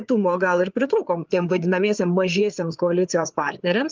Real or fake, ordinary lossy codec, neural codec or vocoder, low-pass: fake; Opus, 32 kbps; codec, 16 kHz, 4 kbps, FreqCodec, larger model; 7.2 kHz